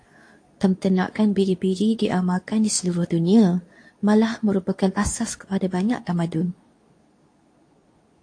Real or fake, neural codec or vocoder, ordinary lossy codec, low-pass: fake; codec, 24 kHz, 0.9 kbps, WavTokenizer, medium speech release version 2; AAC, 48 kbps; 9.9 kHz